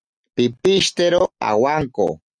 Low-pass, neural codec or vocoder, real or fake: 9.9 kHz; none; real